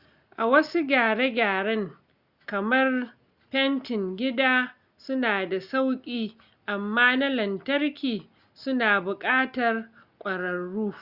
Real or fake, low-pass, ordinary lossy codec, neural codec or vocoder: real; 5.4 kHz; Opus, 64 kbps; none